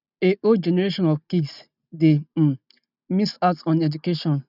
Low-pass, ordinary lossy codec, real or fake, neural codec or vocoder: 5.4 kHz; none; real; none